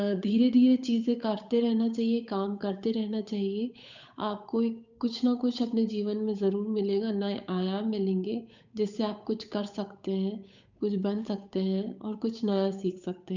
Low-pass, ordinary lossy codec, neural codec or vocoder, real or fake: 7.2 kHz; none; codec, 16 kHz, 8 kbps, FunCodec, trained on Chinese and English, 25 frames a second; fake